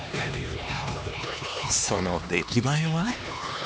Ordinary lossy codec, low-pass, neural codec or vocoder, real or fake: none; none; codec, 16 kHz, 2 kbps, X-Codec, HuBERT features, trained on LibriSpeech; fake